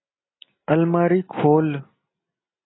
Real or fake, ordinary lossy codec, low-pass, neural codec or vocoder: real; AAC, 16 kbps; 7.2 kHz; none